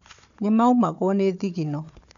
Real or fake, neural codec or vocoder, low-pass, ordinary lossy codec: fake; codec, 16 kHz, 4 kbps, FunCodec, trained on Chinese and English, 50 frames a second; 7.2 kHz; none